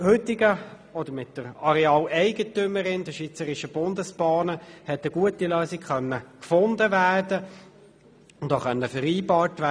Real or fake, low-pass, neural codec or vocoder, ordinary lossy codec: real; none; none; none